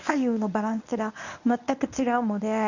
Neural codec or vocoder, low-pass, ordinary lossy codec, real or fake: codec, 24 kHz, 0.9 kbps, WavTokenizer, medium speech release version 1; 7.2 kHz; none; fake